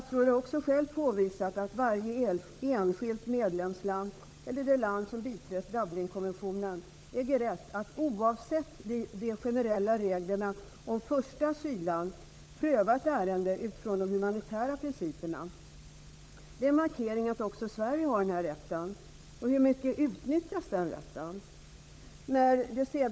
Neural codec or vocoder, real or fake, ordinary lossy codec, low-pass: codec, 16 kHz, 16 kbps, FunCodec, trained on LibriTTS, 50 frames a second; fake; none; none